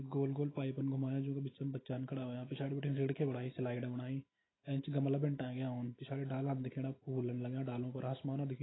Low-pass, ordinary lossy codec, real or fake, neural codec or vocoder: 7.2 kHz; AAC, 16 kbps; real; none